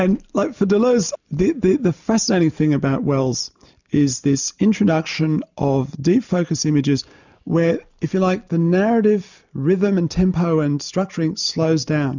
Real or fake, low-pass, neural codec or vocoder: real; 7.2 kHz; none